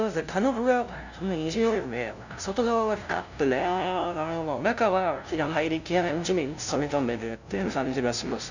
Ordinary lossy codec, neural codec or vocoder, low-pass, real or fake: none; codec, 16 kHz, 0.5 kbps, FunCodec, trained on LibriTTS, 25 frames a second; 7.2 kHz; fake